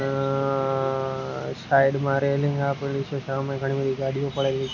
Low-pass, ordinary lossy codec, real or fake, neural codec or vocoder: 7.2 kHz; none; real; none